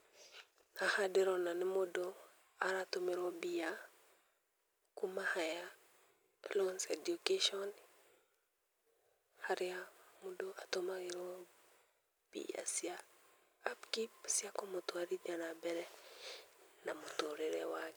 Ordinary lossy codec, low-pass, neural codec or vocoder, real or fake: none; none; none; real